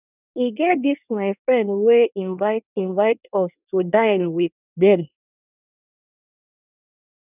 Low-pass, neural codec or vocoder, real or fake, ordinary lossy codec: 3.6 kHz; codec, 24 kHz, 1 kbps, SNAC; fake; none